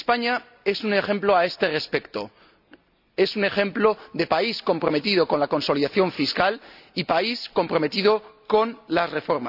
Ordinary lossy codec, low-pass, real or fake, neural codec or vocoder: none; 5.4 kHz; real; none